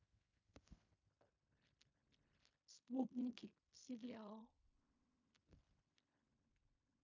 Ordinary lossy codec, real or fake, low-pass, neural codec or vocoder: MP3, 48 kbps; fake; 7.2 kHz; codec, 16 kHz in and 24 kHz out, 0.4 kbps, LongCat-Audio-Codec, fine tuned four codebook decoder